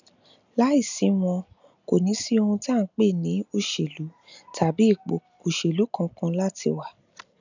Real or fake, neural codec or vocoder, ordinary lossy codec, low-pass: real; none; none; 7.2 kHz